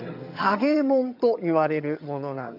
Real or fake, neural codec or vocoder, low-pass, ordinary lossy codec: fake; vocoder, 22.05 kHz, 80 mel bands, HiFi-GAN; 5.4 kHz; none